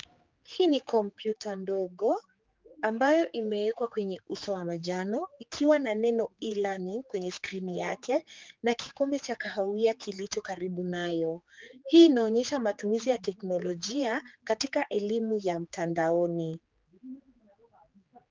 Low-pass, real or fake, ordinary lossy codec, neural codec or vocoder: 7.2 kHz; fake; Opus, 32 kbps; codec, 16 kHz, 4 kbps, X-Codec, HuBERT features, trained on general audio